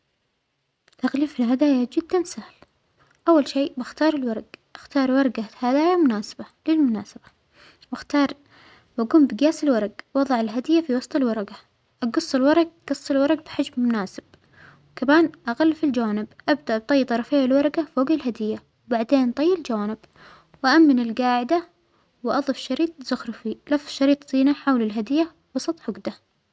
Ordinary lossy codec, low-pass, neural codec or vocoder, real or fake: none; none; none; real